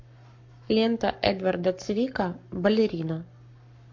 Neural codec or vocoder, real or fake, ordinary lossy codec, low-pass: codec, 44.1 kHz, 7.8 kbps, Pupu-Codec; fake; MP3, 48 kbps; 7.2 kHz